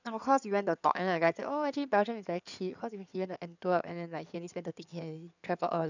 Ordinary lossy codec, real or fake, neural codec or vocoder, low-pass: none; fake; codec, 16 kHz in and 24 kHz out, 2.2 kbps, FireRedTTS-2 codec; 7.2 kHz